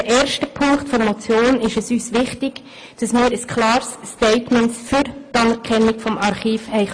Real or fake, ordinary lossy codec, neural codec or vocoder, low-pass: real; AAC, 48 kbps; none; 9.9 kHz